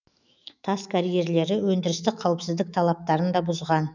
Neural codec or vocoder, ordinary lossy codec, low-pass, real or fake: autoencoder, 48 kHz, 128 numbers a frame, DAC-VAE, trained on Japanese speech; none; 7.2 kHz; fake